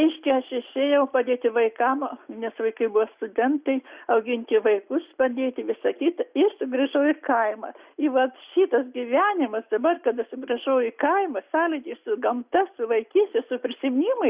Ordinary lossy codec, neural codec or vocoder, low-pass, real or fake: Opus, 64 kbps; none; 3.6 kHz; real